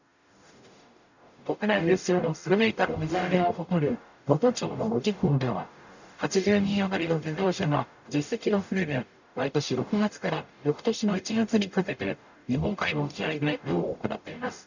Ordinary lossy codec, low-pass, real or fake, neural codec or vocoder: none; 7.2 kHz; fake; codec, 44.1 kHz, 0.9 kbps, DAC